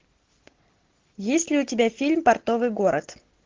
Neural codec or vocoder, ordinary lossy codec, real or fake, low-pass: none; Opus, 16 kbps; real; 7.2 kHz